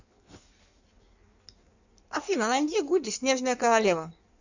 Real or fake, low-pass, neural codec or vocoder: fake; 7.2 kHz; codec, 16 kHz in and 24 kHz out, 1.1 kbps, FireRedTTS-2 codec